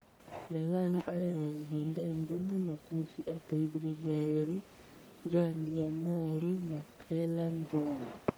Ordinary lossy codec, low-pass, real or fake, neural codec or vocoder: none; none; fake; codec, 44.1 kHz, 1.7 kbps, Pupu-Codec